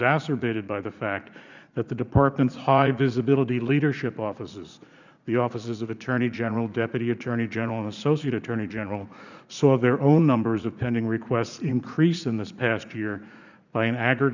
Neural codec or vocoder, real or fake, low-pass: vocoder, 44.1 kHz, 80 mel bands, Vocos; fake; 7.2 kHz